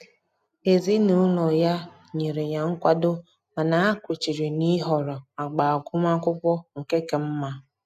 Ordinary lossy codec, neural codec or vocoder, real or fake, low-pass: none; none; real; 14.4 kHz